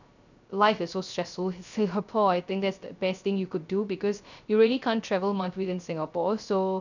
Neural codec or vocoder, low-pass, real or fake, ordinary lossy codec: codec, 16 kHz, 0.3 kbps, FocalCodec; 7.2 kHz; fake; none